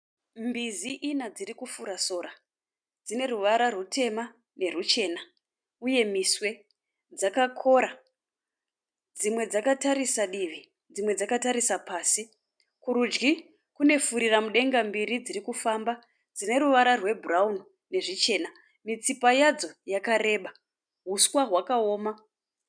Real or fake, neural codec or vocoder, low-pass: real; none; 9.9 kHz